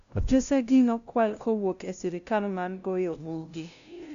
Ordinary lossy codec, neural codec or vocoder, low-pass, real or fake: MP3, 96 kbps; codec, 16 kHz, 0.5 kbps, FunCodec, trained on LibriTTS, 25 frames a second; 7.2 kHz; fake